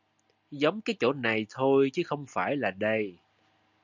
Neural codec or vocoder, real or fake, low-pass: none; real; 7.2 kHz